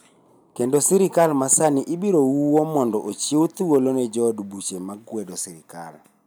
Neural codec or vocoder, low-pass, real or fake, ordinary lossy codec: none; none; real; none